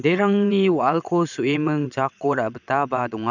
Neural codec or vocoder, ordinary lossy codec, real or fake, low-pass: vocoder, 22.05 kHz, 80 mel bands, WaveNeXt; none; fake; 7.2 kHz